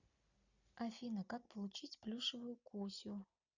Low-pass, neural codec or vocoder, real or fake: 7.2 kHz; none; real